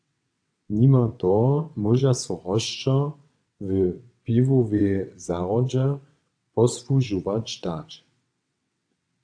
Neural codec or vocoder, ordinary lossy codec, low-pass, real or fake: vocoder, 22.05 kHz, 80 mel bands, WaveNeXt; AAC, 64 kbps; 9.9 kHz; fake